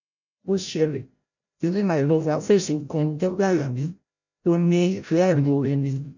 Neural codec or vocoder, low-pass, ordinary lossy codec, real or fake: codec, 16 kHz, 0.5 kbps, FreqCodec, larger model; 7.2 kHz; none; fake